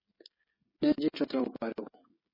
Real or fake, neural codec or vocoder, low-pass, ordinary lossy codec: fake; codec, 16 kHz, 16 kbps, FreqCodec, smaller model; 5.4 kHz; MP3, 24 kbps